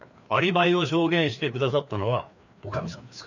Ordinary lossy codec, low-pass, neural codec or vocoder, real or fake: none; 7.2 kHz; codec, 16 kHz, 2 kbps, FreqCodec, larger model; fake